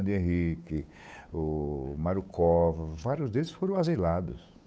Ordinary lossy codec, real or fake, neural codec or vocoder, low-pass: none; fake; codec, 16 kHz, 8 kbps, FunCodec, trained on Chinese and English, 25 frames a second; none